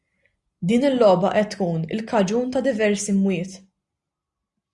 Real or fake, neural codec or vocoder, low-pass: real; none; 10.8 kHz